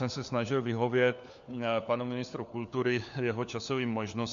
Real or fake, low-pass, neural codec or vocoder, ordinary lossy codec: fake; 7.2 kHz; codec, 16 kHz, 4 kbps, FunCodec, trained on LibriTTS, 50 frames a second; MP3, 64 kbps